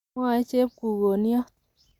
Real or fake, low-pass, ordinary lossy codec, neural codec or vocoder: real; 19.8 kHz; Opus, 64 kbps; none